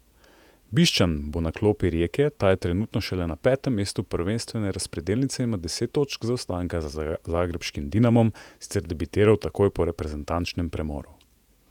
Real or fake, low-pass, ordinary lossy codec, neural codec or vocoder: fake; 19.8 kHz; none; vocoder, 48 kHz, 128 mel bands, Vocos